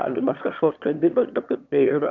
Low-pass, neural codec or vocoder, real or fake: 7.2 kHz; autoencoder, 22.05 kHz, a latent of 192 numbers a frame, VITS, trained on one speaker; fake